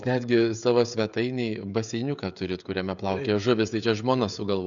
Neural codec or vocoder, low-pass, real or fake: codec, 16 kHz, 16 kbps, FreqCodec, smaller model; 7.2 kHz; fake